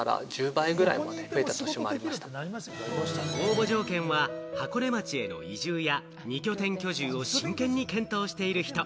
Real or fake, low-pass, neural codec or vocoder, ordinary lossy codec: real; none; none; none